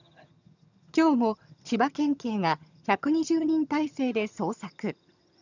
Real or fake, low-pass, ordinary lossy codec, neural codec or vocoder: fake; 7.2 kHz; none; vocoder, 22.05 kHz, 80 mel bands, HiFi-GAN